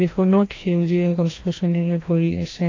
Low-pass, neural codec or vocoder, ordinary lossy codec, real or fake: 7.2 kHz; codec, 16 kHz, 1 kbps, FreqCodec, larger model; AAC, 32 kbps; fake